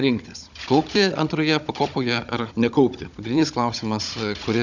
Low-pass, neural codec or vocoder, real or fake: 7.2 kHz; codec, 16 kHz, 16 kbps, FunCodec, trained on Chinese and English, 50 frames a second; fake